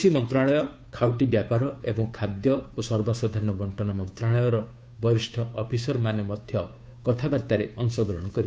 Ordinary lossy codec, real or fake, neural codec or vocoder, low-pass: none; fake; codec, 16 kHz, 2 kbps, FunCodec, trained on Chinese and English, 25 frames a second; none